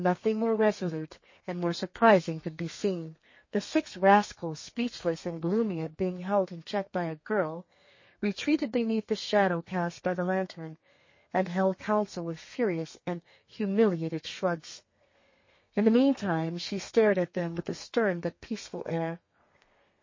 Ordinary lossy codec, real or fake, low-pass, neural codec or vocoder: MP3, 32 kbps; fake; 7.2 kHz; codec, 32 kHz, 1.9 kbps, SNAC